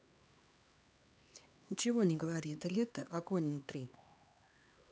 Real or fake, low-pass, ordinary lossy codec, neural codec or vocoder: fake; none; none; codec, 16 kHz, 2 kbps, X-Codec, HuBERT features, trained on LibriSpeech